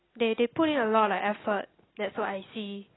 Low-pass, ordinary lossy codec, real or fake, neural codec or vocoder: 7.2 kHz; AAC, 16 kbps; real; none